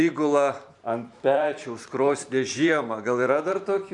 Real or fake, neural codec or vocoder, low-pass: fake; vocoder, 44.1 kHz, 128 mel bands every 512 samples, BigVGAN v2; 10.8 kHz